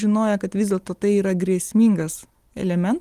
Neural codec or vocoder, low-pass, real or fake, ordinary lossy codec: none; 14.4 kHz; real; Opus, 24 kbps